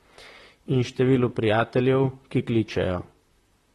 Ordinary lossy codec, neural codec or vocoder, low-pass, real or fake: AAC, 32 kbps; vocoder, 44.1 kHz, 128 mel bands, Pupu-Vocoder; 19.8 kHz; fake